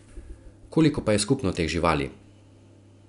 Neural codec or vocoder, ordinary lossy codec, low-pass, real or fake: none; none; 10.8 kHz; real